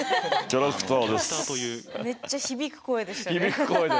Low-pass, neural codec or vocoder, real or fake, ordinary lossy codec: none; none; real; none